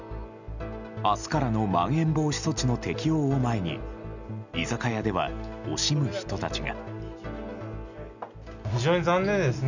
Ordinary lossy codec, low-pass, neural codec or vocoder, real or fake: none; 7.2 kHz; none; real